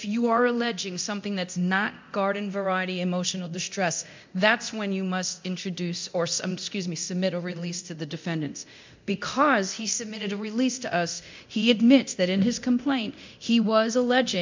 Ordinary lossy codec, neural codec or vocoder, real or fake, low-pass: MP3, 64 kbps; codec, 24 kHz, 0.9 kbps, DualCodec; fake; 7.2 kHz